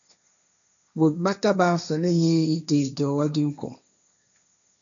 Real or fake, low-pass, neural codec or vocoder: fake; 7.2 kHz; codec, 16 kHz, 1.1 kbps, Voila-Tokenizer